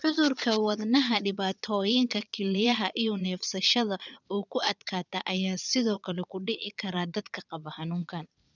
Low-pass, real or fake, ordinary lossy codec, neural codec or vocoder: 7.2 kHz; fake; none; vocoder, 22.05 kHz, 80 mel bands, Vocos